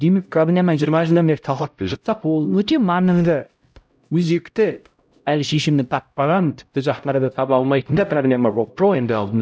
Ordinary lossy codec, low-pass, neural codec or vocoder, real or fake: none; none; codec, 16 kHz, 0.5 kbps, X-Codec, HuBERT features, trained on LibriSpeech; fake